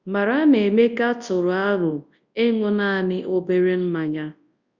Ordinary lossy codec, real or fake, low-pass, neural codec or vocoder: Opus, 64 kbps; fake; 7.2 kHz; codec, 24 kHz, 0.9 kbps, WavTokenizer, large speech release